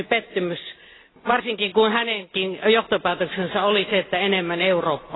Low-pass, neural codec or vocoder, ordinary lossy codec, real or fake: 7.2 kHz; none; AAC, 16 kbps; real